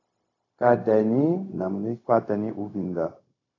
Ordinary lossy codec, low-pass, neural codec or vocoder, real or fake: AAC, 32 kbps; 7.2 kHz; codec, 16 kHz, 0.4 kbps, LongCat-Audio-Codec; fake